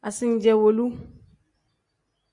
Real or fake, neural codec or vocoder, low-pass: real; none; 10.8 kHz